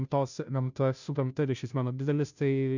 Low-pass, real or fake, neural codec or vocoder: 7.2 kHz; fake; codec, 16 kHz, 0.5 kbps, FunCodec, trained on Chinese and English, 25 frames a second